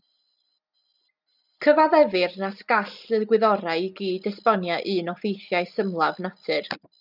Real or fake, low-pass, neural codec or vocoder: real; 5.4 kHz; none